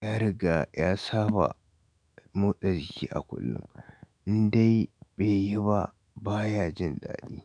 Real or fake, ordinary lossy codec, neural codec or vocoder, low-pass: fake; MP3, 96 kbps; autoencoder, 48 kHz, 128 numbers a frame, DAC-VAE, trained on Japanese speech; 9.9 kHz